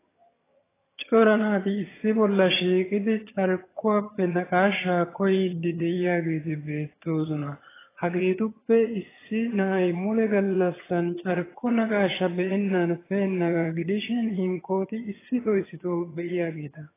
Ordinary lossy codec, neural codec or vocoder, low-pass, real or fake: AAC, 16 kbps; vocoder, 22.05 kHz, 80 mel bands, HiFi-GAN; 3.6 kHz; fake